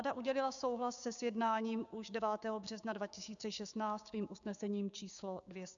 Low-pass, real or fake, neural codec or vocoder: 7.2 kHz; fake; codec, 16 kHz, 6 kbps, DAC